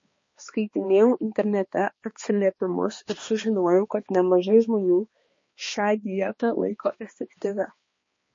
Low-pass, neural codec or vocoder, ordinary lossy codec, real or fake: 7.2 kHz; codec, 16 kHz, 2 kbps, X-Codec, HuBERT features, trained on balanced general audio; MP3, 32 kbps; fake